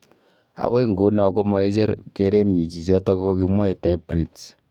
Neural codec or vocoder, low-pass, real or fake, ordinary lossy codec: codec, 44.1 kHz, 2.6 kbps, DAC; 19.8 kHz; fake; none